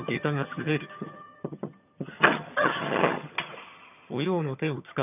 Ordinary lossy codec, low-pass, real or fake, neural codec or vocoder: none; 3.6 kHz; fake; vocoder, 22.05 kHz, 80 mel bands, HiFi-GAN